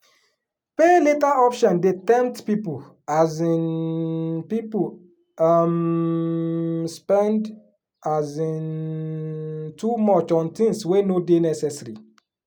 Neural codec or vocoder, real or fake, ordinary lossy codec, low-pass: none; real; none; 19.8 kHz